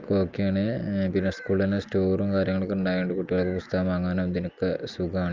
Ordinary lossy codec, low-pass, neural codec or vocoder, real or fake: Opus, 24 kbps; 7.2 kHz; none; real